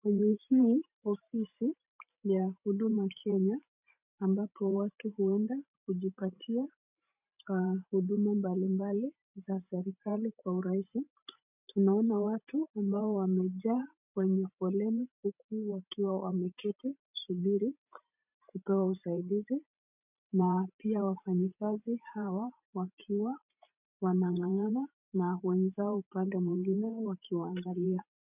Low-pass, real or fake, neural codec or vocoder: 3.6 kHz; fake; vocoder, 44.1 kHz, 128 mel bands every 512 samples, BigVGAN v2